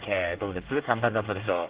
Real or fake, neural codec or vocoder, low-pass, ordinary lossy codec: fake; codec, 24 kHz, 1 kbps, SNAC; 3.6 kHz; Opus, 16 kbps